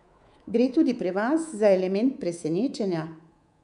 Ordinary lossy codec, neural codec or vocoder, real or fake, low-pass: none; codec, 24 kHz, 3.1 kbps, DualCodec; fake; 10.8 kHz